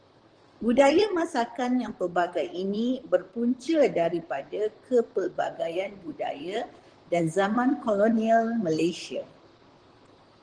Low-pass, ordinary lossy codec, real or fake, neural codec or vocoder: 9.9 kHz; Opus, 16 kbps; fake; vocoder, 44.1 kHz, 128 mel bands, Pupu-Vocoder